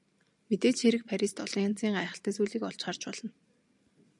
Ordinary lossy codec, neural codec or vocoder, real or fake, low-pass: MP3, 96 kbps; none; real; 10.8 kHz